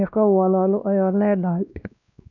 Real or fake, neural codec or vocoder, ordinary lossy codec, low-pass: fake; codec, 16 kHz, 1 kbps, X-Codec, WavLM features, trained on Multilingual LibriSpeech; none; 7.2 kHz